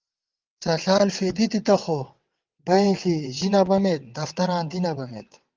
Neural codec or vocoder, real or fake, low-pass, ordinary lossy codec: vocoder, 22.05 kHz, 80 mel bands, WaveNeXt; fake; 7.2 kHz; Opus, 32 kbps